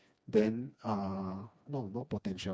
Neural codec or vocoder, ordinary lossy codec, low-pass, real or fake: codec, 16 kHz, 2 kbps, FreqCodec, smaller model; none; none; fake